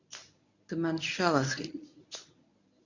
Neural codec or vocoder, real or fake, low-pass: codec, 24 kHz, 0.9 kbps, WavTokenizer, medium speech release version 1; fake; 7.2 kHz